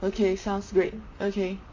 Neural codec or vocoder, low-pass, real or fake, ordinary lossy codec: vocoder, 44.1 kHz, 128 mel bands, Pupu-Vocoder; 7.2 kHz; fake; AAC, 48 kbps